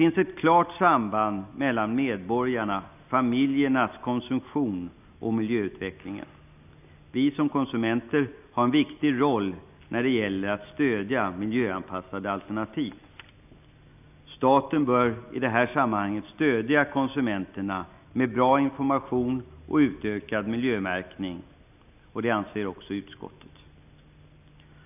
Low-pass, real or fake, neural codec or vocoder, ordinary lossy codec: 3.6 kHz; real; none; none